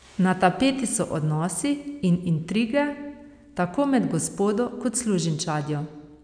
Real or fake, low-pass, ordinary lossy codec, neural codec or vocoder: real; 9.9 kHz; none; none